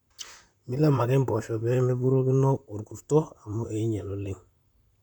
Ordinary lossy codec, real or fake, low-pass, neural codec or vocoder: none; fake; 19.8 kHz; vocoder, 44.1 kHz, 128 mel bands, Pupu-Vocoder